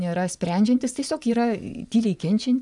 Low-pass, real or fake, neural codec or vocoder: 10.8 kHz; fake; vocoder, 24 kHz, 100 mel bands, Vocos